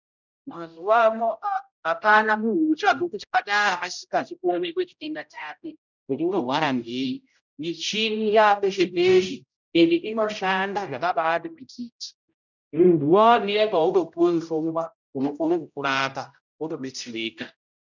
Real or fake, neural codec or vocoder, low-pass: fake; codec, 16 kHz, 0.5 kbps, X-Codec, HuBERT features, trained on general audio; 7.2 kHz